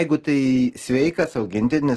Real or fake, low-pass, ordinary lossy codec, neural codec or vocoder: real; 10.8 kHz; Opus, 16 kbps; none